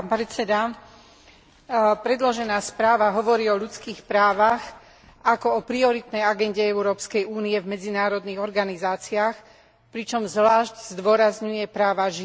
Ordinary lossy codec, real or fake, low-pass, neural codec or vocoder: none; real; none; none